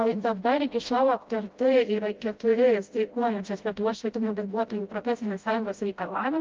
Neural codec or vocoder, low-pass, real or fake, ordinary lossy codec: codec, 16 kHz, 0.5 kbps, FreqCodec, smaller model; 7.2 kHz; fake; Opus, 24 kbps